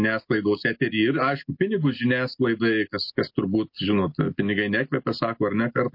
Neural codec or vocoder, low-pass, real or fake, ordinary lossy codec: none; 5.4 kHz; real; MP3, 32 kbps